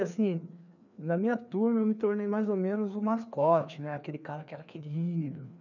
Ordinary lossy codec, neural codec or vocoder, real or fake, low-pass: none; codec, 16 kHz, 2 kbps, FreqCodec, larger model; fake; 7.2 kHz